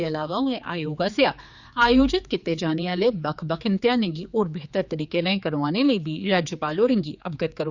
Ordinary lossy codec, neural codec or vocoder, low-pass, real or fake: Opus, 64 kbps; codec, 16 kHz, 4 kbps, X-Codec, HuBERT features, trained on general audio; 7.2 kHz; fake